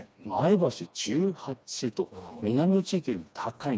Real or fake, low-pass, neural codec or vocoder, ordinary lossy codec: fake; none; codec, 16 kHz, 2 kbps, FreqCodec, smaller model; none